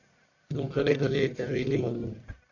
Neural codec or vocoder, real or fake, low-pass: codec, 44.1 kHz, 1.7 kbps, Pupu-Codec; fake; 7.2 kHz